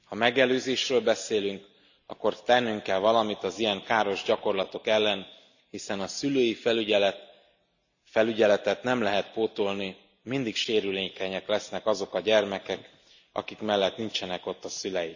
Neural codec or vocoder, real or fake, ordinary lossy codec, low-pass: none; real; none; 7.2 kHz